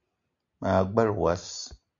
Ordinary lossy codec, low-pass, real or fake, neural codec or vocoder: MP3, 64 kbps; 7.2 kHz; real; none